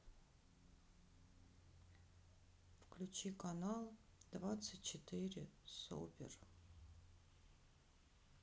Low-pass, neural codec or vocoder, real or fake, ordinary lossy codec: none; none; real; none